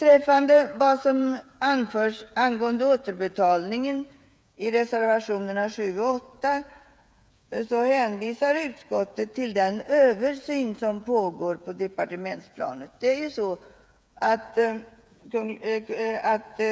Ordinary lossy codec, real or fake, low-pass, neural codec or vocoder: none; fake; none; codec, 16 kHz, 8 kbps, FreqCodec, smaller model